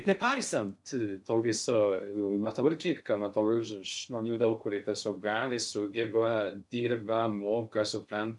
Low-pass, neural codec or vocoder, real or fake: 10.8 kHz; codec, 16 kHz in and 24 kHz out, 0.6 kbps, FocalCodec, streaming, 2048 codes; fake